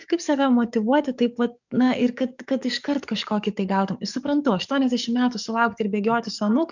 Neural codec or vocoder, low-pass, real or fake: codec, 44.1 kHz, 7.8 kbps, DAC; 7.2 kHz; fake